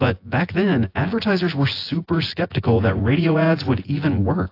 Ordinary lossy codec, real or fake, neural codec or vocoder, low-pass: AAC, 32 kbps; fake; vocoder, 24 kHz, 100 mel bands, Vocos; 5.4 kHz